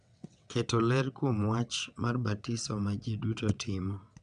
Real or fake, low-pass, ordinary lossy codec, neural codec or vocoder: fake; 9.9 kHz; none; vocoder, 22.05 kHz, 80 mel bands, WaveNeXt